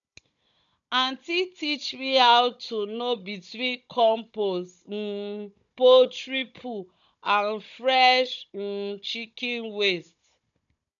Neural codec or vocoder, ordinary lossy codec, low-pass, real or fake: codec, 16 kHz, 16 kbps, FunCodec, trained on Chinese and English, 50 frames a second; none; 7.2 kHz; fake